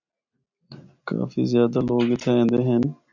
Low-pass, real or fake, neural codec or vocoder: 7.2 kHz; real; none